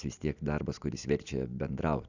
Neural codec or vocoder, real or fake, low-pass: none; real; 7.2 kHz